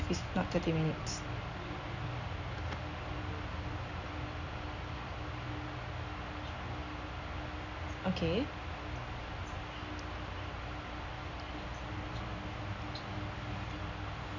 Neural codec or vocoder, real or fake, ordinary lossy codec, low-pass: none; real; none; 7.2 kHz